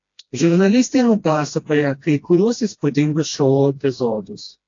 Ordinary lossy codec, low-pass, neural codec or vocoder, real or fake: AAC, 48 kbps; 7.2 kHz; codec, 16 kHz, 1 kbps, FreqCodec, smaller model; fake